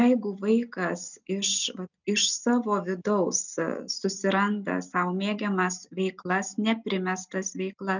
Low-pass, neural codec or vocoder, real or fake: 7.2 kHz; none; real